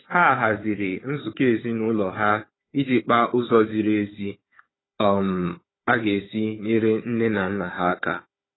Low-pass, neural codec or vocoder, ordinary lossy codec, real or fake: 7.2 kHz; codec, 16 kHz, 4 kbps, FunCodec, trained on Chinese and English, 50 frames a second; AAC, 16 kbps; fake